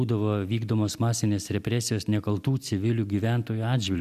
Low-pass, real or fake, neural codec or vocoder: 14.4 kHz; real; none